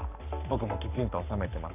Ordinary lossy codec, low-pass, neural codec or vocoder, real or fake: none; 3.6 kHz; codec, 44.1 kHz, 7.8 kbps, Pupu-Codec; fake